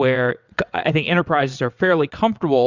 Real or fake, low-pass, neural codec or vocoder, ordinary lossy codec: fake; 7.2 kHz; vocoder, 22.05 kHz, 80 mel bands, WaveNeXt; Opus, 64 kbps